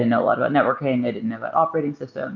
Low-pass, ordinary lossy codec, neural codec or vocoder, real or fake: 7.2 kHz; Opus, 24 kbps; none; real